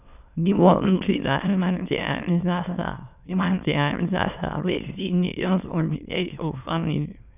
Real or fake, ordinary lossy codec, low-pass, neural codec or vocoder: fake; none; 3.6 kHz; autoencoder, 22.05 kHz, a latent of 192 numbers a frame, VITS, trained on many speakers